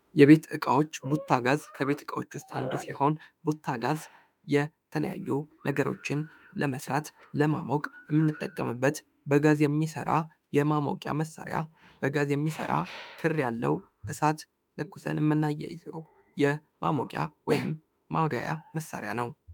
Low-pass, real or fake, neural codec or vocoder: 19.8 kHz; fake; autoencoder, 48 kHz, 32 numbers a frame, DAC-VAE, trained on Japanese speech